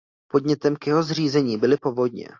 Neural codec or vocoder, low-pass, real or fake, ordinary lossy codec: none; 7.2 kHz; real; AAC, 48 kbps